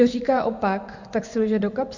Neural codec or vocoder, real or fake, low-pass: vocoder, 24 kHz, 100 mel bands, Vocos; fake; 7.2 kHz